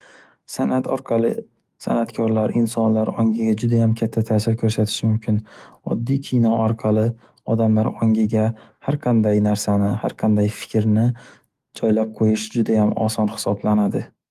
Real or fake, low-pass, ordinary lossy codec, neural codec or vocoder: fake; 14.4 kHz; Opus, 24 kbps; autoencoder, 48 kHz, 128 numbers a frame, DAC-VAE, trained on Japanese speech